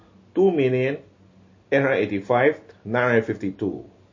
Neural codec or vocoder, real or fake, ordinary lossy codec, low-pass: none; real; MP3, 32 kbps; 7.2 kHz